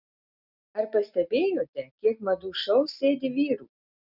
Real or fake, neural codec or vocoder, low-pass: real; none; 5.4 kHz